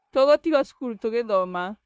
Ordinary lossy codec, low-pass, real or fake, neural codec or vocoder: none; none; fake; codec, 16 kHz, 0.9 kbps, LongCat-Audio-Codec